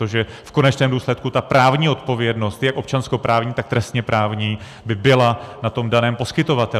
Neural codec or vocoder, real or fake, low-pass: none; real; 14.4 kHz